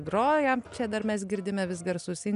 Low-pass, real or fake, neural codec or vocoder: 10.8 kHz; real; none